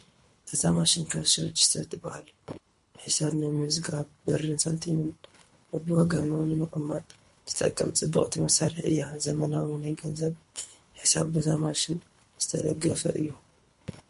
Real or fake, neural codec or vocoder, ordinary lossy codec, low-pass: fake; codec, 24 kHz, 3 kbps, HILCodec; MP3, 48 kbps; 10.8 kHz